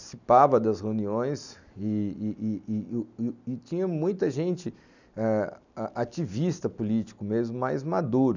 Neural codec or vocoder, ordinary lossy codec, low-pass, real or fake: none; none; 7.2 kHz; real